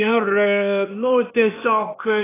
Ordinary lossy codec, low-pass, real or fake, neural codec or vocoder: AAC, 16 kbps; 3.6 kHz; fake; codec, 16 kHz, 0.8 kbps, ZipCodec